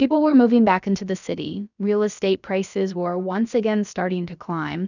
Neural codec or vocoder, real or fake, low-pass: codec, 16 kHz, about 1 kbps, DyCAST, with the encoder's durations; fake; 7.2 kHz